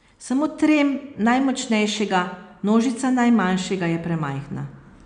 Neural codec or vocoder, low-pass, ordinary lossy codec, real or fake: none; 9.9 kHz; none; real